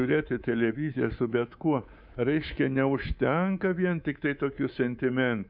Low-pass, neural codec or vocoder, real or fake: 5.4 kHz; codec, 24 kHz, 3.1 kbps, DualCodec; fake